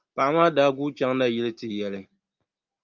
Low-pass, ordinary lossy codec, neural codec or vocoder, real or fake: 7.2 kHz; Opus, 24 kbps; none; real